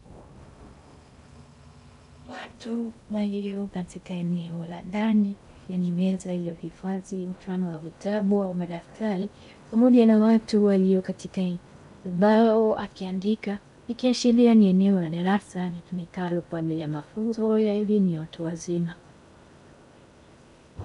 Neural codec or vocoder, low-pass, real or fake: codec, 16 kHz in and 24 kHz out, 0.6 kbps, FocalCodec, streaming, 2048 codes; 10.8 kHz; fake